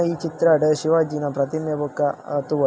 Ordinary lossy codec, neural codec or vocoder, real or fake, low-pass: none; none; real; none